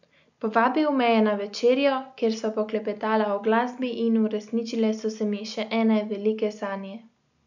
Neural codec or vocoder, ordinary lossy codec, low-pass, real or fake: none; none; 7.2 kHz; real